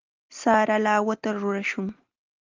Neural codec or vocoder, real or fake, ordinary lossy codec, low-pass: none; real; Opus, 32 kbps; 7.2 kHz